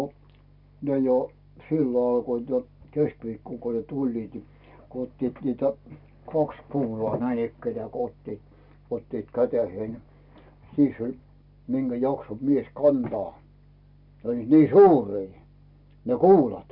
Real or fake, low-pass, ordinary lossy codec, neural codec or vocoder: real; 5.4 kHz; none; none